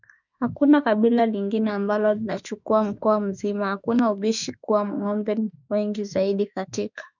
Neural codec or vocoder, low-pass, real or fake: autoencoder, 48 kHz, 32 numbers a frame, DAC-VAE, trained on Japanese speech; 7.2 kHz; fake